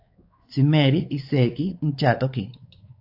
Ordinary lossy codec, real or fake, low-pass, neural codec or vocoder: AAC, 32 kbps; fake; 5.4 kHz; codec, 16 kHz, 4 kbps, X-Codec, WavLM features, trained on Multilingual LibriSpeech